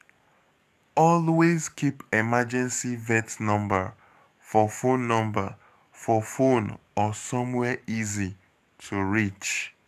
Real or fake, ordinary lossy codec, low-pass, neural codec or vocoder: fake; none; 14.4 kHz; codec, 44.1 kHz, 7.8 kbps, DAC